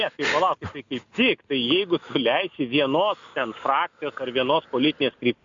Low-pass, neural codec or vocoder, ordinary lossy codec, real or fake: 7.2 kHz; none; AAC, 64 kbps; real